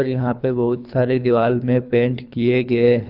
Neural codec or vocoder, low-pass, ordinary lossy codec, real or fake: codec, 24 kHz, 3 kbps, HILCodec; 5.4 kHz; Opus, 64 kbps; fake